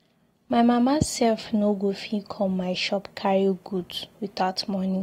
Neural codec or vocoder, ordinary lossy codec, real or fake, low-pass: none; AAC, 48 kbps; real; 19.8 kHz